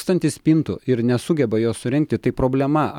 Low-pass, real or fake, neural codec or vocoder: 19.8 kHz; real; none